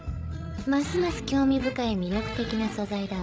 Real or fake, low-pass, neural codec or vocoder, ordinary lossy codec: fake; none; codec, 16 kHz, 16 kbps, FreqCodec, larger model; none